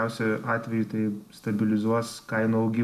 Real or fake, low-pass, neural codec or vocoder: real; 14.4 kHz; none